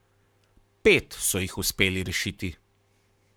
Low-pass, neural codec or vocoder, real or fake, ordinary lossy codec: none; codec, 44.1 kHz, 7.8 kbps, Pupu-Codec; fake; none